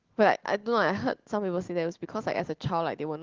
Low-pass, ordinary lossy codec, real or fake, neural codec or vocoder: 7.2 kHz; Opus, 16 kbps; real; none